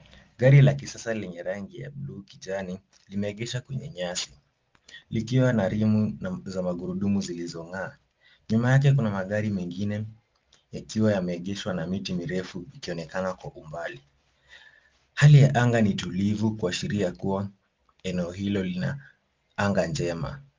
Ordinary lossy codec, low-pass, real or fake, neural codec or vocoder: Opus, 32 kbps; 7.2 kHz; real; none